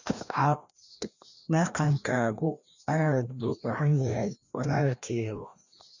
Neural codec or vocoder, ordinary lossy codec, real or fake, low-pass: codec, 16 kHz, 1 kbps, FreqCodec, larger model; none; fake; 7.2 kHz